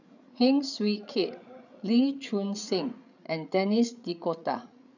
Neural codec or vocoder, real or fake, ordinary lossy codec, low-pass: codec, 16 kHz, 8 kbps, FreqCodec, larger model; fake; none; 7.2 kHz